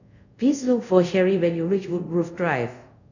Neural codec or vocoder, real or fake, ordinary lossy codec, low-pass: codec, 24 kHz, 0.5 kbps, DualCodec; fake; none; 7.2 kHz